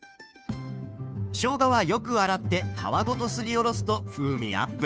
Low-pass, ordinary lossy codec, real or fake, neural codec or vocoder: none; none; fake; codec, 16 kHz, 2 kbps, FunCodec, trained on Chinese and English, 25 frames a second